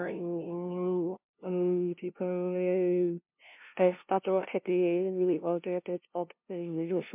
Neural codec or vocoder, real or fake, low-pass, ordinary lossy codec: codec, 16 kHz, 0.5 kbps, FunCodec, trained on LibriTTS, 25 frames a second; fake; 3.6 kHz; MP3, 32 kbps